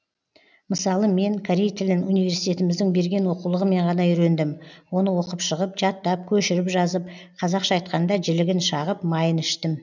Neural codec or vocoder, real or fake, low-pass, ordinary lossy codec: none; real; 7.2 kHz; none